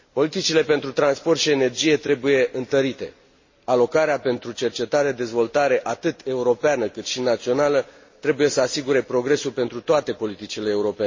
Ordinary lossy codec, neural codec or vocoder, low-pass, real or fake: MP3, 32 kbps; none; 7.2 kHz; real